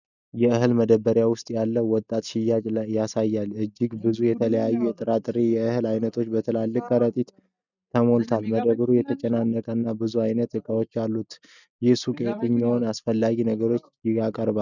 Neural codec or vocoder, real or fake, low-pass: none; real; 7.2 kHz